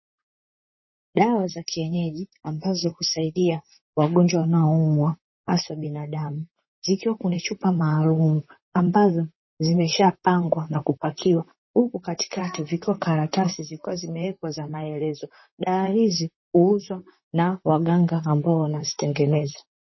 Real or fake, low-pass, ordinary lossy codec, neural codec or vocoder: fake; 7.2 kHz; MP3, 24 kbps; vocoder, 44.1 kHz, 128 mel bands, Pupu-Vocoder